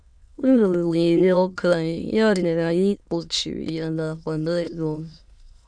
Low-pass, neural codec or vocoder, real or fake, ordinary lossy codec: 9.9 kHz; autoencoder, 22.05 kHz, a latent of 192 numbers a frame, VITS, trained on many speakers; fake; none